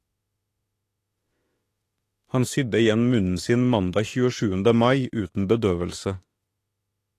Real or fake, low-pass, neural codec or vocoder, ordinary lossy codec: fake; 14.4 kHz; autoencoder, 48 kHz, 32 numbers a frame, DAC-VAE, trained on Japanese speech; AAC, 48 kbps